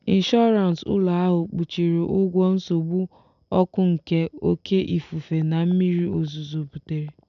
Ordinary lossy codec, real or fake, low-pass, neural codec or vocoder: none; real; 7.2 kHz; none